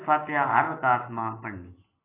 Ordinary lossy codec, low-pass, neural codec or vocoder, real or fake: AAC, 16 kbps; 3.6 kHz; none; real